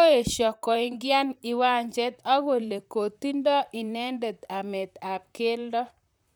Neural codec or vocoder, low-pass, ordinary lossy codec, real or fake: vocoder, 44.1 kHz, 128 mel bands, Pupu-Vocoder; none; none; fake